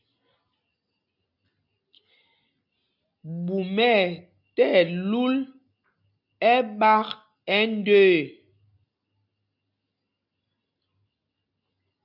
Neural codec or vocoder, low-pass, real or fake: none; 5.4 kHz; real